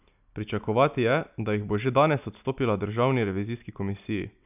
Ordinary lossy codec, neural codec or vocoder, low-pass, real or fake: none; none; 3.6 kHz; real